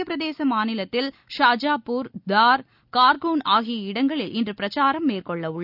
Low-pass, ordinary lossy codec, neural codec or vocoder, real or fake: 5.4 kHz; none; none; real